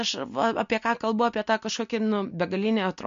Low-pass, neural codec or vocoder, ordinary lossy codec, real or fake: 7.2 kHz; none; MP3, 48 kbps; real